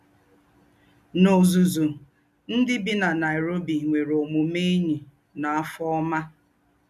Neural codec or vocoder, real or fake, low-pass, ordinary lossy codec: none; real; 14.4 kHz; none